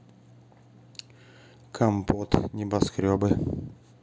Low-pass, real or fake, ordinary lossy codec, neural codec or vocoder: none; real; none; none